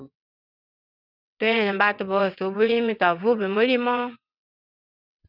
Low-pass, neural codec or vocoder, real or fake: 5.4 kHz; vocoder, 22.05 kHz, 80 mel bands, WaveNeXt; fake